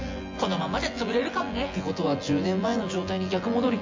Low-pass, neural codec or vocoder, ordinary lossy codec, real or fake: 7.2 kHz; vocoder, 24 kHz, 100 mel bands, Vocos; MP3, 48 kbps; fake